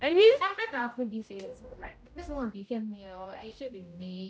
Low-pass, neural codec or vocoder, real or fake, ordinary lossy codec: none; codec, 16 kHz, 0.5 kbps, X-Codec, HuBERT features, trained on general audio; fake; none